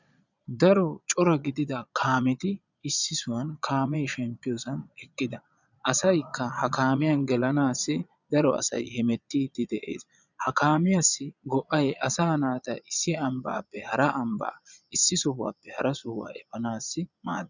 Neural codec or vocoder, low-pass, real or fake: vocoder, 22.05 kHz, 80 mel bands, Vocos; 7.2 kHz; fake